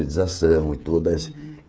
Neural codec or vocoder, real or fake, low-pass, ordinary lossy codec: codec, 16 kHz, 16 kbps, FreqCodec, smaller model; fake; none; none